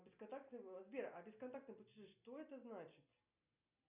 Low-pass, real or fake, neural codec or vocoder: 3.6 kHz; real; none